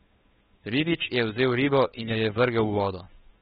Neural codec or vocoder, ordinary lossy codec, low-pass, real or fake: codec, 16 kHz, 2 kbps, FunCodec, trained on Chinese and English, 25 frames a second; AAC, 16 kbps; 7.2 kHz; fake